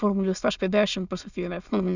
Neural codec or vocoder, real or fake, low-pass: autoencoder, 22.05 kHz, a latent of 192 numbers a frame, VITS, trained on many speakers; fake; 7.2 kHz